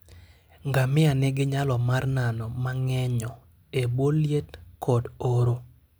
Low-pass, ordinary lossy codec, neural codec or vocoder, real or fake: none; none; none; real